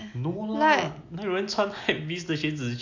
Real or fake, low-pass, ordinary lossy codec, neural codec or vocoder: real; 7.2 kHz; AAC, 48 kbps; none